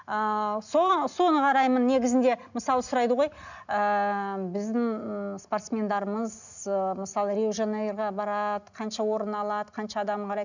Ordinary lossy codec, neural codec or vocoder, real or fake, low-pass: none; none; real; 7.2 kHz